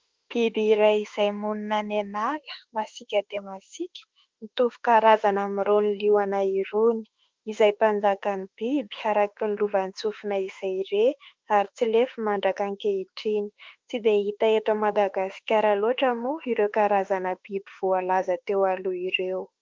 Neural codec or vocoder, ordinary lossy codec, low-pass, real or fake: autoencoder, 48 kHz, 32 numbers a frame, DAC-VAE, trained on Japanese speech; Opus, 32 kbps; 7.2 kHz; fake